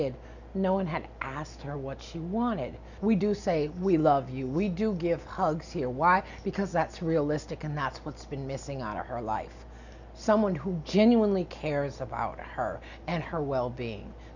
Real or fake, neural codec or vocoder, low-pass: real; none; 7.2 kHz